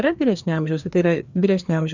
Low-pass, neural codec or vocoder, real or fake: 7.2 kHz; codec, 16 kHz, 2 kbps, FreqCodec, larger model; fake